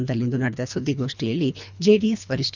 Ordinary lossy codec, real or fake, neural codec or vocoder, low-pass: none; fake; codec, 24 kHz, 3 kbps, HILCodec; 7.2 kHz